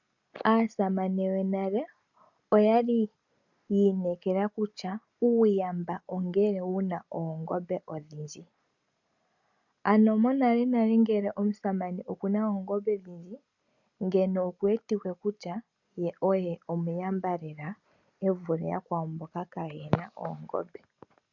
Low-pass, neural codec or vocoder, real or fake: 7.2 kHz; none; real